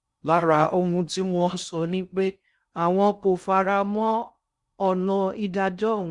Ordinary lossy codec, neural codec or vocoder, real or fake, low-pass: Opus, 64 kbps; codec, 16 kHz in and 24 kHz out, 0.6 kbps, FocalCodec, streaming, 2048 codes; fake; 10.8 kHz